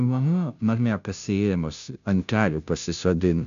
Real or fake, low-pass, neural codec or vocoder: fake; 7.2 kHz; codec, 16 kHz, 0.5 kbps, FunCodec, trained on Chinese and English, 25 frames a second